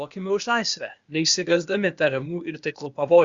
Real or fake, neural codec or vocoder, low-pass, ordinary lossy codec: fake; codec, 16 kHz, 0.8 kbps, ZipCodec; 7.2 kHz; Opus, 64 kbps